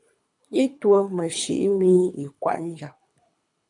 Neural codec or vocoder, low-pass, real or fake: codec, 24 kHz, 3 kbps, HILCodec; 10.8 kHz; fake